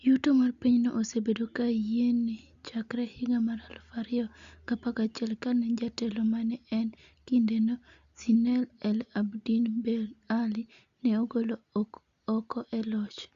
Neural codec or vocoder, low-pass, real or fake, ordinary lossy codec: none; 7.2 kHz; real; Opus, 64 kbps